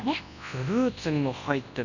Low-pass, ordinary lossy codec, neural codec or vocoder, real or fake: 7.2 kHz; none; codec, 24 kHz, 0.9 kbps, WavTokenizer, large speech release; fake